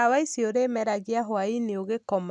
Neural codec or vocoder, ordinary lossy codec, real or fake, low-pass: none; none; real; 10.8 kHz